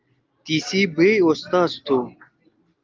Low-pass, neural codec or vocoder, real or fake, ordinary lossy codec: 7.2 kHz; none; real; Opus, 32 kbps